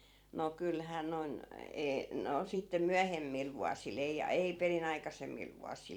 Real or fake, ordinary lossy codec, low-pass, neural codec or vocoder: fake; none; 19.8 kHz; vocoder, 44.1 kHz, 128 mel bands every 256 samples, BigVGAN v2